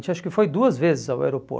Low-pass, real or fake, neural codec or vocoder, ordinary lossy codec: none; real; none; none